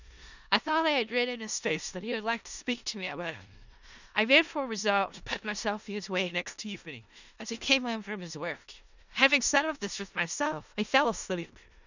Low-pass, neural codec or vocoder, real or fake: 7.2 kHz; codec, 16 kHz in and 24 kHz out, 0.4 kbps, LongCat-Audio-Codec, four codebook decoder; fake